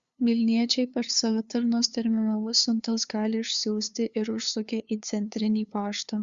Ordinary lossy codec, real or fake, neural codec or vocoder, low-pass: Opus, 64 kbps; fake; codec, 16 kHz, 2 kbps, FunCodec, trained on LibriTTS, 25 frames a second; 7.2 kHz